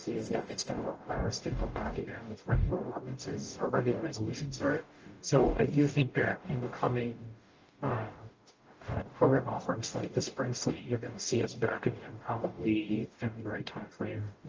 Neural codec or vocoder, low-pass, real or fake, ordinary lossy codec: codec, 44.1 kHz, 0.9 kbps, DAC; 7.2 kHz; fake; Opus, 24 kbps